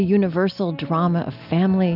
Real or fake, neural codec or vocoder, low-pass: real; none; 5.4 kHz